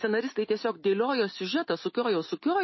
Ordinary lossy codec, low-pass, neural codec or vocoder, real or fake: MP3, 24 kbps; 7.2 kHz; none; real